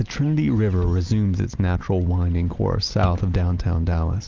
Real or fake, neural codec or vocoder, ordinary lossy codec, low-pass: real; none; Opus, 32 kbps; 7.2 kHz